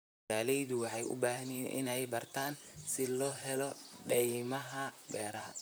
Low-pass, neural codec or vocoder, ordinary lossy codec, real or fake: none; vocoder, 44.1 kHz, 128 mel bands, Pupu-Vocoder; none; fake